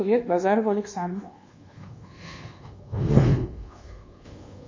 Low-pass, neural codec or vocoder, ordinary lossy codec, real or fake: 7.2 kHz; codec, 24 kHz, 1.2 kbps, DualCodec; MP3, 32 kbps; fake